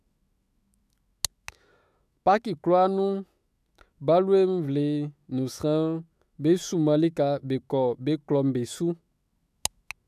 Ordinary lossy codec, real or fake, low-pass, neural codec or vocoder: none; fake; 14.4 kHz; autoencoder, 48 kHz, 128 numbers a frame, DAC-VAE, trained on Japanese speech